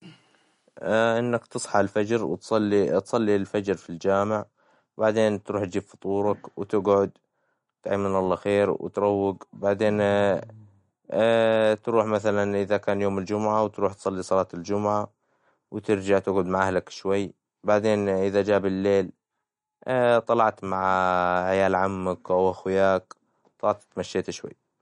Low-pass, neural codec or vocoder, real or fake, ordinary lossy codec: 10.8 kHz; none; real; MP3, 48 kbps